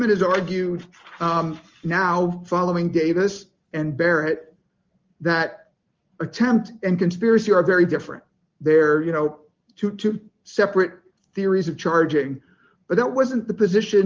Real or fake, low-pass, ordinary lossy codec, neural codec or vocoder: real; 7.2 kHz; Opus, 32 kbps; none